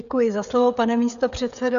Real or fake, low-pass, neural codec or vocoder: fake; 7.2 kHz; codec, 16 kHz, 16 kbps, FreqCodec, smaller model